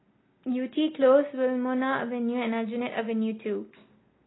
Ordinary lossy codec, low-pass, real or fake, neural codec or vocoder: AAC, 16 kbps; 7.2 kHz; real; none